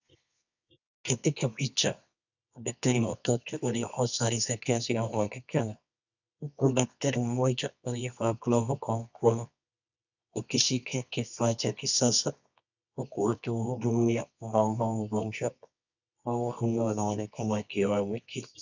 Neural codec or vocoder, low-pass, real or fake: codec, 24 kHz, 0.9 kbps, WavTokenizer, medium music audio release; 7.2 kHz; fake